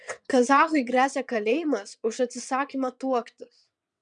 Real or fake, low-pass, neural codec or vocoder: fake; 9.9 kHz; vocoder, 22.05 kHz, 80 mel bands, WaveNeXt